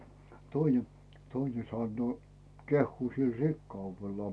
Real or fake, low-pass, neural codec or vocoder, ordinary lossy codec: real; none; none; none